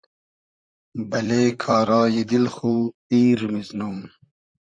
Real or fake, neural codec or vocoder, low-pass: fake; vocoder, 44.1 kHz, 128 mel bands, Pupu-Vocoder; 9.9 kHz